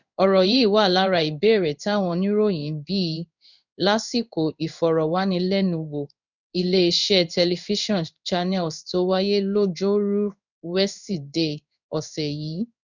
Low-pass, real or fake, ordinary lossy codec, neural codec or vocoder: 7.2 kHz; fake; none; codec, 16 kHz in and 24 kHz out, 1 kbps, XY-Tokenizer